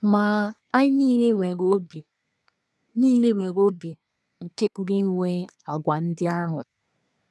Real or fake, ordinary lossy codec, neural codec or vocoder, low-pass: fake; none; codec, 24 kHz, 1 kbps, SNAC; none